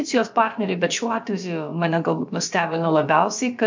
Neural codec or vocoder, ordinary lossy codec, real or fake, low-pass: codec, 16 kHz, about 1 kbps, DyCAST, with the encoder's durations; MP3, 64 kbps; fake; 7.2 kHz